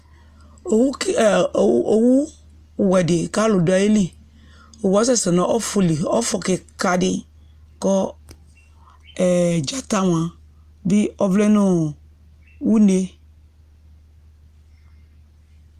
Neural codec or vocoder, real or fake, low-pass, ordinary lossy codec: none; real; 14.4 kHz; Opus, 32 kbps